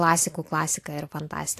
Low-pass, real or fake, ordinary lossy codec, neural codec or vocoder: 14.4 kHz; real; AAC, 64 kbps; none